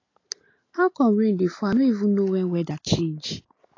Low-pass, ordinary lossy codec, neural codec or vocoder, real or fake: 7.2 kHz; AAC, 32 kbps; none; real